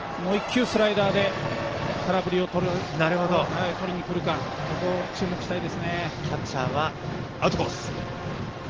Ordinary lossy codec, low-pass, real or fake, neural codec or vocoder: Opus, 16 kbps; 7.2 kHz; real; none